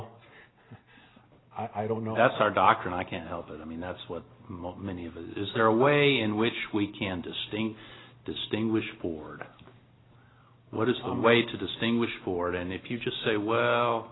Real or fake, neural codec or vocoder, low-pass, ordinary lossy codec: real; none; 7.2 kHz; AAC, 16 kbps